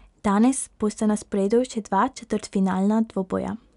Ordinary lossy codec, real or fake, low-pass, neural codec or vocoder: none; real; 10.8 kHz; none